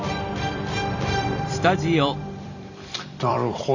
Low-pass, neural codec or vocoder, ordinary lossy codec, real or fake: 7.2 kHz; vocoder, 44.1 kHz, 128 mel bands every 256 samples, BigVGAN v2; none; fake